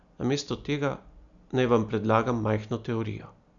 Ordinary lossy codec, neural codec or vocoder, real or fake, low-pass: none; none; real; 7.2 kHz